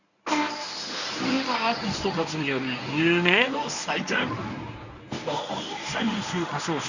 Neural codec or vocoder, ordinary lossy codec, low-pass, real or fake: codec, 24 kHz, 0.9 kbps, WavTokenizer, medium speech release version 1; none; 7.2 kHz; fake